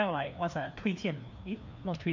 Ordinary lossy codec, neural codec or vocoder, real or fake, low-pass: MP3, 48 kbps; codec, 16 kHz, 2 kbps, FreqCodec, larger model; fake; 7.2 kHz